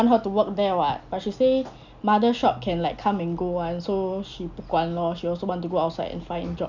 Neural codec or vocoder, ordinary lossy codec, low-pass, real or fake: none; none; 7.2 kHz; real